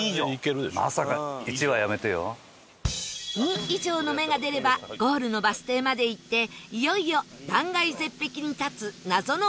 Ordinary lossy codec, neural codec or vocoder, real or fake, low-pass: none; none; real; none